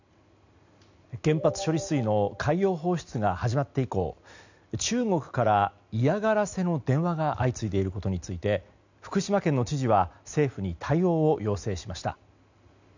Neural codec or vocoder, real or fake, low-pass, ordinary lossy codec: none; real; 7.2 kHz; none